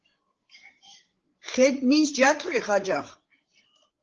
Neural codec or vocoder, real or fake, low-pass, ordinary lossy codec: codec, 16 kHz, 8 kbps, FreqCodec, larger model; fake; 7.2 kHz; Opus, 16 kbps